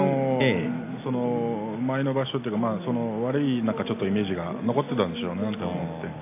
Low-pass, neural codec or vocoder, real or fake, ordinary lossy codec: 3.6 kHz; none; real; AAC, 24 kbps